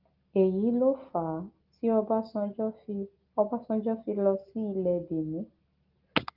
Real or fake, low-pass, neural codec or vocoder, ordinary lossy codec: real; 5.4 kHz; none; Opus, 32 kbps